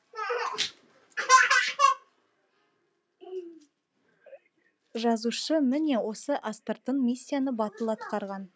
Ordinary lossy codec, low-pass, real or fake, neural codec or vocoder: none; none; real; none